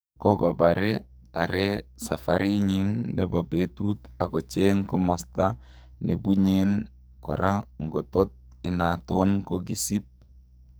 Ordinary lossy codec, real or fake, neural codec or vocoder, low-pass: none; fake; codec, 44.1 kHz, 2.6 kbps, SNAC; none